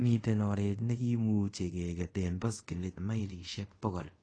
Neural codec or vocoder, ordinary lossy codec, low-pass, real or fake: codec, 24 kHz, 0.5 kbps, DualCodec; AAC, 32 kbps; 9.9 kHz; fake